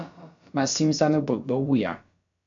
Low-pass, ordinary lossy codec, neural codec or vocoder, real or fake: 7.2 kHz; AAC, 64 kbps; codec, 16 kHz, about 1 kbps, DyCAST, with the encoder's durations; fake